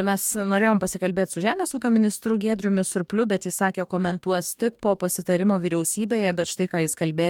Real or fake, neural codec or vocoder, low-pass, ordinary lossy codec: fake; codec, 44.1 kHz, 2.6 kbps, DAC; 19.8 kHz; MP3, 96 kbps